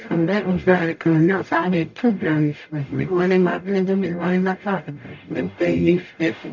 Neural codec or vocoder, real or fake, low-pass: codec, 44.1 kHz, 0.9 kbps, DAC; fake; 7.2 kHz